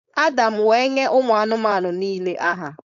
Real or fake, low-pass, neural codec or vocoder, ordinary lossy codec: fake; 7.2 kHz; codec, 16 kHz, 4.8 kbps, FACodec; none